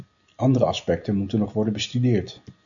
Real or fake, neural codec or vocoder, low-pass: real; none; 7.2 kHz